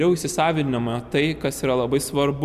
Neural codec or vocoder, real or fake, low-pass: none; real; 14.4 kHz